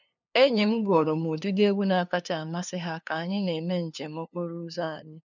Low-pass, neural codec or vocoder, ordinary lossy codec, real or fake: 7.2 kHz; codec, 16 kHz, 2 kbps, FunCodec, trained on LibriTTS, 25 frames a second; none; fake